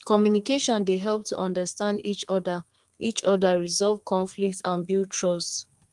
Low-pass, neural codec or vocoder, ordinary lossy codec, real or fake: 10.8 kHz; codec, 32 kHz, 1.9 kbps, SNAC; Opus, 32 kbps; fake